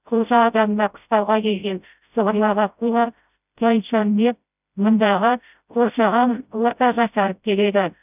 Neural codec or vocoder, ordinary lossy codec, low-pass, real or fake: codec, 16 kHz, 0.5 kbps, FreqCodec, smaller model; none; 3.6 kHz; fake